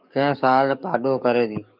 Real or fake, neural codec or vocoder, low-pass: fake; codec, 44.1 kHz, 7.8 kbps, DAC; 5.4 kHz